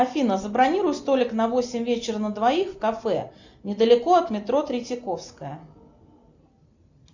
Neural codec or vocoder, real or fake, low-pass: none; real; 7.2 kHz